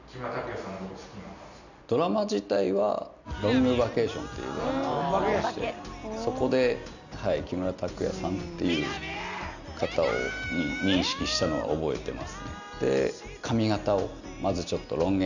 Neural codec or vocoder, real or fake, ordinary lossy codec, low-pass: none; real; none; 7.2 kHz